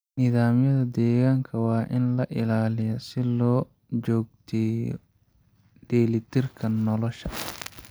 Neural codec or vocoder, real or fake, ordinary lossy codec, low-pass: none; real; none; none